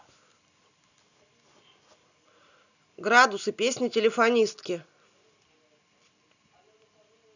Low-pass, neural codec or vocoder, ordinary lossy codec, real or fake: 7.2 kHz; none; none; real